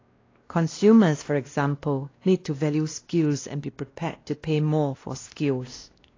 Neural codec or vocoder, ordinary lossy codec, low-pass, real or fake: codec, 16 kHz, 1 kbps, X-Codec, WavLM features, trained on Multilingual LibriSpeech; AAC, 32 kbps; 7.2 kHz; fake